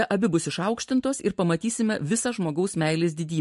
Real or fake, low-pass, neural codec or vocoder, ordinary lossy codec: real; 14.4 kHz; none; MP3, 48 kbps